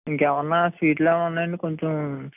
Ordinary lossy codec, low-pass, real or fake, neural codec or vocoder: none; 3.6 kHz; real; none